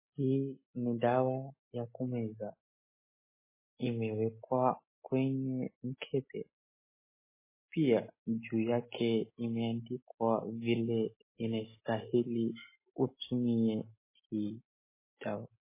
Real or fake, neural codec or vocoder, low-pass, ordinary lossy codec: real; none; 3.6 kHz; MP3, 16 kbps